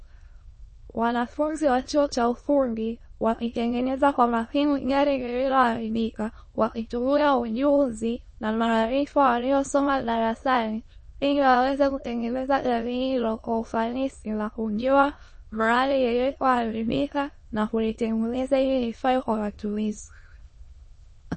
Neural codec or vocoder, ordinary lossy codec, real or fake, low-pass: autoencoder, 22.05 kHz, a latent of 192 numbers a frame, VITS, trained on many speakers; MP3, 32 kbps; fake; 9.9 kHz